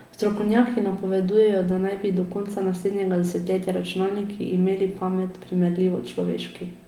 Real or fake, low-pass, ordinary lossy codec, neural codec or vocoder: real; 19.8 kHz; Opus, 24 kbps; none